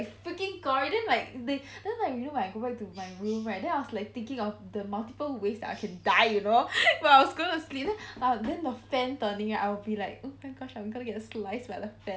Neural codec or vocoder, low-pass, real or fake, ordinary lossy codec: none; none; real; none